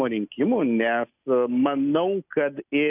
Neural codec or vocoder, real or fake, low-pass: none; real; 3.6 kHz